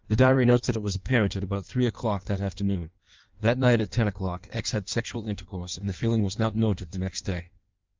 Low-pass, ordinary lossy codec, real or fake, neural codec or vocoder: 7.2 kHz; Opus, 24 kbps; fake; codec, 16 kHz in and 24 kHz out, 1.1 kbps, FireRedTTS-2 codec